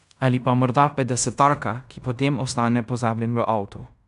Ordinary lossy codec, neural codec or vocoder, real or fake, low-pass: none; codec, 16 kHz in and 24 kHz out, 0.9 kbps, LongCat-Audio-Codec, fine tuned four codebook decoder; fake; 10.8 kHz